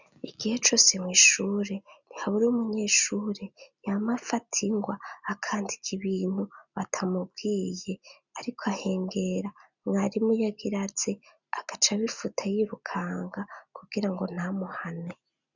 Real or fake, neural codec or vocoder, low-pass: real; none; 7.2 kHz